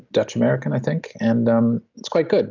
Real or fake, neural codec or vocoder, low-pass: real; none; 7.2 kHz